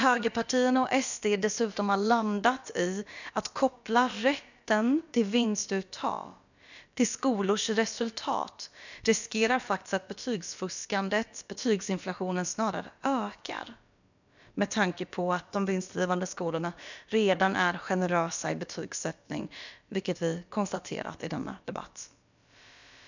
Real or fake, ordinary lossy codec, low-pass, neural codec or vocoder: fake; none; 7.2 kHz; codec, 16 kHz, about 1 kbps, DyCAST, with the encoder's durations